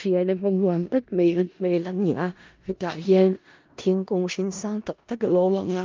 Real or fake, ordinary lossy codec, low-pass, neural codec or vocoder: fake; Opus, 24 kbps; 7.2 kHz; codec, 16 kHz in and 24 kHz out, 0.4 kbps, LongCat-Audio-Codec, four codebook decoder